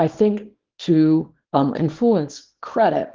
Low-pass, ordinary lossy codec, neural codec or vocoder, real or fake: 7.2 kHz; Opus, 16 kbps; codec, 24 kHz, 0.9 kbps, WavTokenizer, small release; fake